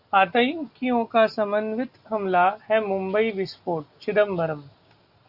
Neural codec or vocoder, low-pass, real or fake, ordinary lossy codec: none; 5.4 kHz; real; Opus, 64 kbps